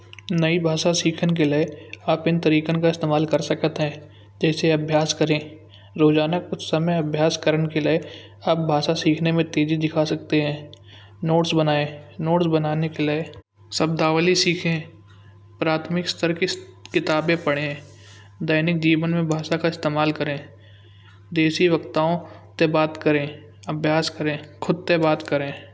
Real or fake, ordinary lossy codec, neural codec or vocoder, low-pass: real; none; none; none